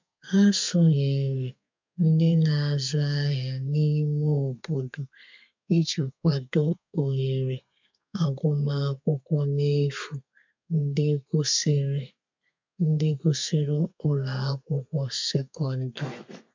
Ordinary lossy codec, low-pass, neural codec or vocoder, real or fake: MP3, 64 kbps; 7.2 kHz; codec, 32 kHz, 1.9 kbps, SNAC; fake